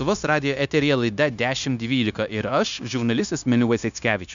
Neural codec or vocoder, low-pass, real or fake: codec, 16 kHz, 0.9 kbps, LongCat-Audio-Codec; 7.2 kHz; fake